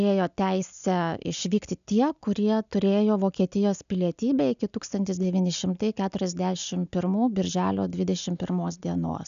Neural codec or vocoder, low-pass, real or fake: none; 7.2 kHz; real